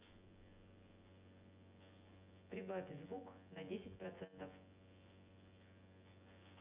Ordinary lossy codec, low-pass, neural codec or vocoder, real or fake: Opus, 64 kbps; 3.6 kHz; vocoder, 24 kHz, 100 mel bands, Vocos; fake